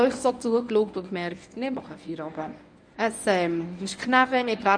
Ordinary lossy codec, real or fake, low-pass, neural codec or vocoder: none; fake; 9.9 kHz; codec, 24 kHz, 0.9 kbps, WavTokenizer, medium speech release version 1